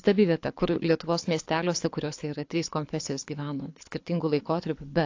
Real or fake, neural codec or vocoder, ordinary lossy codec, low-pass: fake; codec, 24 kHz, 6 kbps, HILCodec; AAC, 48 kbps; 7.2 kHz